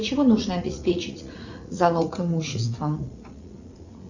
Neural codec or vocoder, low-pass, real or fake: vocoder, 44.1 kHz, 80 mel bands, Vocos; 7.2 kHz; fake